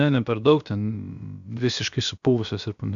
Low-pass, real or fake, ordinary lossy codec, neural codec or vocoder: 7.2 kHz; fake; Opus, 64 kbps; codec, 16 kHz, about 1 kbps, DyCAST, with the encoder's durations